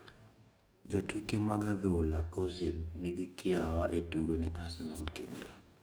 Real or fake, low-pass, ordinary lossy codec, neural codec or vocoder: fake; none; none; codec, 44.1 kHz, 2.6 kbps, DAC